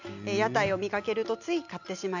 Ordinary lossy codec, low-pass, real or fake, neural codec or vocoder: none; 7.2 kHz; real; none